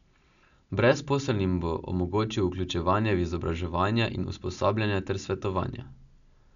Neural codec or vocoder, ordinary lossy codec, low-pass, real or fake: none; none; 7.2 kHz; real